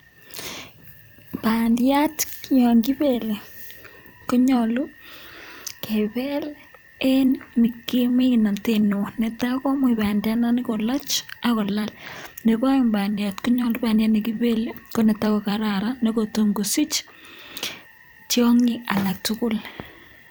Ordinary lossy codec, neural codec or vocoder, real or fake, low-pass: none; vocoder, 44.1 kHz, 128 mel bands every 256 samples, BigVGAN v2; fake; none